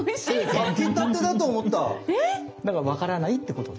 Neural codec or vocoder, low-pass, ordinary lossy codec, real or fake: none; none; none; real